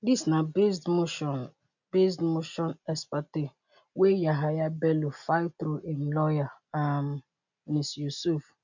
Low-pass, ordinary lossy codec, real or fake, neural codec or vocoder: 7.2 kHz; none; real; none